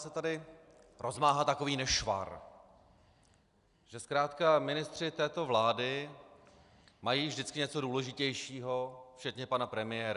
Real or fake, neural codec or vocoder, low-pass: real; none; 10.8 kHz